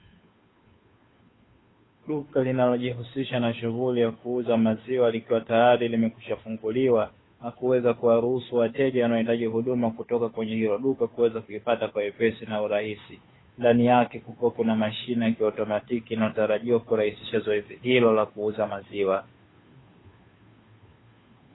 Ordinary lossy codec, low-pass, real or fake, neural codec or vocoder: AAC, 16 kbps; 7.2 kHz; fake; codec, 16 kHz, 2 kbps, FunCodec, trained on Chinese and English, 25 frames a second